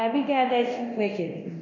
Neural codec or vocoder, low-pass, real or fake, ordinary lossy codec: codec, 24 kHz, 0.9 kbps, DualCodec; 7.2 kHz; fake; none